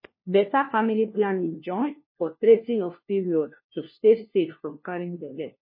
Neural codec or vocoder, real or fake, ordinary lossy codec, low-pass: codec, 16 kHz, 1 kbps, FunCodec, trained on LibriTTS, 50 frames a second; fake; MP3, 24 kbps; 5.4 kHz